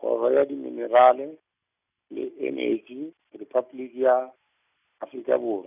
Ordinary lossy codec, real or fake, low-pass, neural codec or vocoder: none; real; 3.6 kHz; none